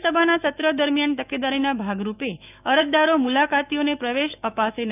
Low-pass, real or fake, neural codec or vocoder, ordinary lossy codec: 3.6 kHz; fake; autoencoder, 48 kHz, 128 numbers a frame, DAC-VAE, trained on Japanese speech; none